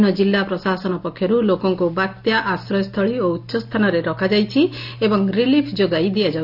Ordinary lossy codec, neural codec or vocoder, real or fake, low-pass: AAC, 48 kbps; none; real; 5.4 kHz